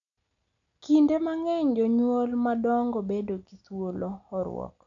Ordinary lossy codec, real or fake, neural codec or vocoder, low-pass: none; real; none; 7.2 kHz